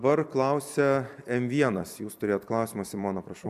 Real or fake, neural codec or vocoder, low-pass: fake; vocoder, 44.1 kHz, 128 mel bands every 256 samples, BigVGAN v2; 14.4 kHz